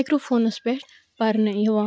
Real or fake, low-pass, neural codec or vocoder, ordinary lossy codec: real; none; none; none